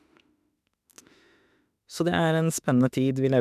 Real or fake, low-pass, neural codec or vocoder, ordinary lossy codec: fake; 14.4 kHz; autoencoder, 48 kHz, 32 numbers a frame, DAC-VAE, trained on Japanese speech; none